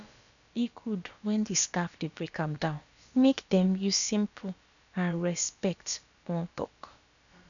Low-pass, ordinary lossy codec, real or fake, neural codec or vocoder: 7.2 kHz; none; fake; codec, 16 kHz, about 1 kbps, DyCAST, with the encoder's durations